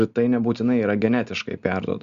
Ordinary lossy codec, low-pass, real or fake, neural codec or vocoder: Opus, 64 kbps; 7.2 kHz; real; none